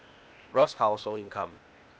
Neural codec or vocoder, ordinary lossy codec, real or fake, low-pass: codec, 16 kHz, 0.8 kbps, ZipCodec; none; fake; none